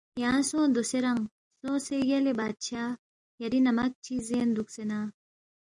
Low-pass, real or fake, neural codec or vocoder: 10.8 kHz; real; none